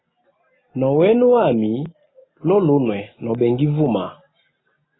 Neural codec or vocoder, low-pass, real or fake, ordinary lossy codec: none; 7.2 kHz; real; AAC, 16 kbps